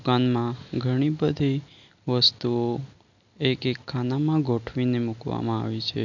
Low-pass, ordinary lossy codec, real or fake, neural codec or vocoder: 7.2 kHz; none; real; none